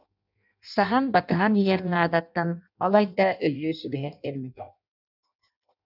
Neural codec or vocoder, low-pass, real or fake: codec, 16 kHz in and 24 kHz out, 0.6 kbps, FireRedTTS-2 codec; 5.4 kHz; fake